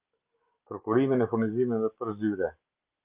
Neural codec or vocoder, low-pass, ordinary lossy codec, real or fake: none; 3.6 kHz; Opus, 24 kbps; real